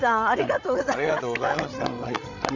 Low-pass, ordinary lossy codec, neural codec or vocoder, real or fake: 7.2 kHz; none; codec, 16 kHz, 16 kbps, FreqCodec, larger model; fake